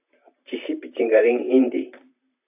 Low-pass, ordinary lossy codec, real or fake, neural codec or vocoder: 3.6 kHz; AAC, 32 kbps; fake; vocoder, 44.1 kHz, 128 mel bands, Pupu-Vocoder